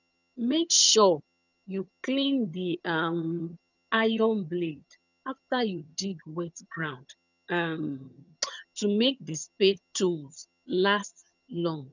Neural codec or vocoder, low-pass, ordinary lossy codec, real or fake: vocoder, 22.05 kHz, 80 mel bands, HiFi-GAN; 7.2 kHz; none; fake